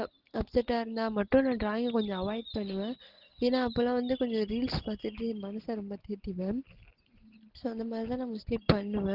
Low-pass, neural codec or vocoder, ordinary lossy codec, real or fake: 5.4 kHz; none; Opus, 16 kbps; real